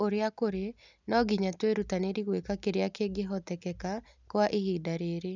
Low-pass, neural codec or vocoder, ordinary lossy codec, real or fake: 7.2 kHz; none; none; real